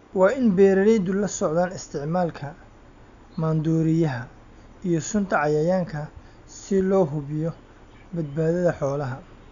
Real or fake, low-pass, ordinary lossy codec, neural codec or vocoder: real; 7.2 kHz; none; none